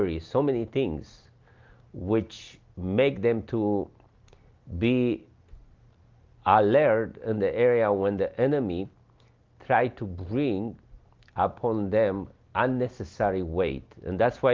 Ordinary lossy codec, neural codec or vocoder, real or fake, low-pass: Opus, 32 kbps; none; real; 7.2 kHz